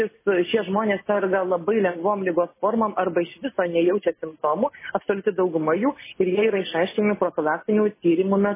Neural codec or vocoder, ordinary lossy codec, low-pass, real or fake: none; MP3, 16 kbps; 3.6 kHz; real